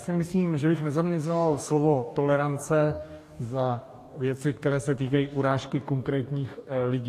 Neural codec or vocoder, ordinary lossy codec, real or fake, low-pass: codec, 44.1 kHz, 2.6 kbps, DAC; AAC, 64 kbps; fake; 14.4 kHz